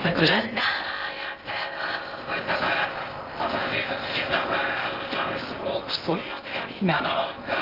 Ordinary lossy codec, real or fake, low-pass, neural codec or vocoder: Opus, 24 kbps; fake; 5.4 kHz; codec, 16 kHz in and 24 kHz out, 0.6 kbps, FocalCodec, streaming, 4096 codes